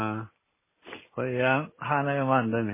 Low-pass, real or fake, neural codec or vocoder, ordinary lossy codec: 3.6 kHz; real; none; MP3, 16 kbps